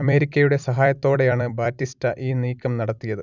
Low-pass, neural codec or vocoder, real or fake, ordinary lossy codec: 7.2 kHz; vocoder, 44.1 kHz, 128 mel bands every 256 samples, BigVGAN v2; fake; none